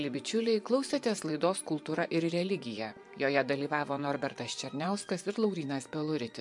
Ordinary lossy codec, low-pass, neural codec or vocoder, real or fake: MP3, 64 kbps; 10.8 kHz; vocoder, 24 kHz, 100 mel bands, Vocos; fake